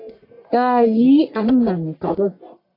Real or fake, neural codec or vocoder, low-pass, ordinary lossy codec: fake; codec, 44.1 kHz, 1.7 kbps, Pupu-Codec; 5.4 kHz; AAC, 32 kbps